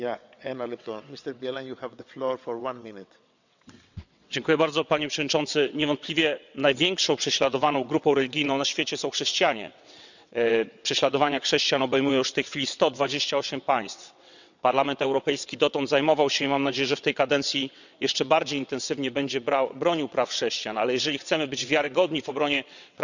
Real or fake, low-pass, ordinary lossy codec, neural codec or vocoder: fake; 7.2 kHz; none; vocoder, 22.05 kHz, 80 mel bands, WaveNeXt